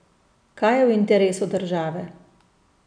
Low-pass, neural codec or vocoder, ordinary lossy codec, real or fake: 9.9 kHz; none; MP3, 96 kbps; real